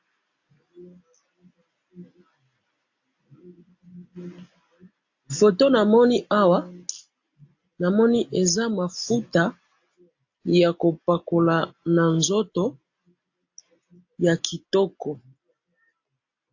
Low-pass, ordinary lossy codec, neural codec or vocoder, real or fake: 7.2 kHz; AAC, 48 kbps; none; real